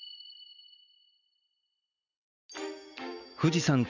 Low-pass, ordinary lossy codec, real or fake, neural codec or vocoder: 7.2 kHz; none; real; none